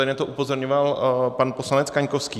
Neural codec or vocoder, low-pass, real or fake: none; 14.4 kHz; real